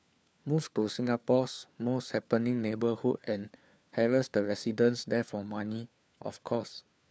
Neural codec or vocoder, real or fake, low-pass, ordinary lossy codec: codec, 16 kHz, 4 kbps, FunCodec, trained on LibriTTS, 50 frames a second; fake; none; none